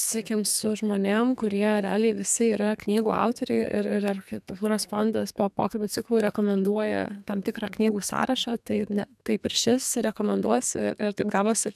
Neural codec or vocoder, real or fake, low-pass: codec, 32 kHz, 1.9 kbps, SNAC; fake; 14.4 kHz